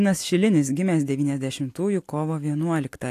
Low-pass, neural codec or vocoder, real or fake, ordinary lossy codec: 14.4 kHz; none; real; AAC, 64 kbps